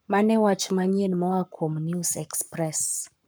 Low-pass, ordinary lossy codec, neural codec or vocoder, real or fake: none; none; codec, 44.1 kHz, 7.8 kbps, Pupu-Codec; fake